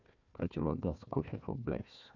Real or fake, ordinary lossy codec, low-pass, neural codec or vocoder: fake; none; 7.2 kHz; codec, 16 kHz, 1 kbps, FunCodec, trained on Chinese and English, 50 frames a second